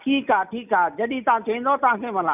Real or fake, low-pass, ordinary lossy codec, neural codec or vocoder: real; 3.6 kHz; none; none